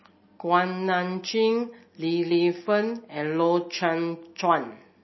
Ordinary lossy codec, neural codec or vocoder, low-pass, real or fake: MP3, 24 kbps; none; 7.2 kHz; real